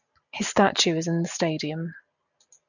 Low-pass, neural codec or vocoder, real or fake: 7.2 kHz; none; real